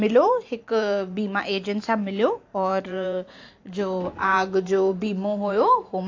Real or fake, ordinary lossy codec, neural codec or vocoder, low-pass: fake; AAC, 48 kbps; vocoder, 44.1 kHz, 128 mel bands every 512 samples, BigVGAN v2; 7.2 kHz